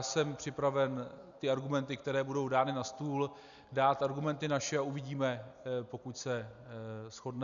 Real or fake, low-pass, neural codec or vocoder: real; 7.2 kHz; none